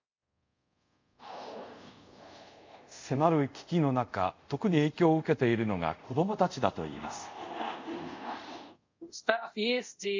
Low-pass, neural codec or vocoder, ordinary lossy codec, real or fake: 7.2 kHz; codec, 24 kHz, 0.5 kbps, DualCodec; MP3, 64 kbps; fake